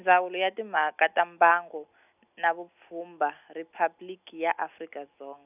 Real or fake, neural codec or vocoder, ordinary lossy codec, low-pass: real; none; none; 3.6 kHz